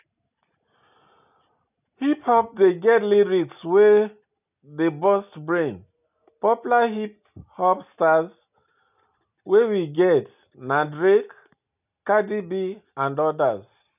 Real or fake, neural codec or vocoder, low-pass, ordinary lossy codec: real; none; 3.6 kHz; none